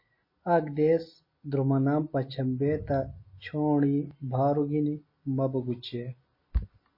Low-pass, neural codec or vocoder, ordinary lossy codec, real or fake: 5.4 kHz; none; MP3, 24 kbps; real